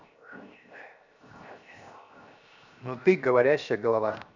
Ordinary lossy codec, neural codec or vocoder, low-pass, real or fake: none; codec, 16 kHz, 0.7 kbps, FocalCodec; 7.2 kHz; fake